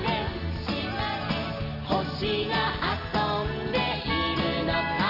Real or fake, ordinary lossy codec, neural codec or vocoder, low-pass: real; none; none; 5.4 kHz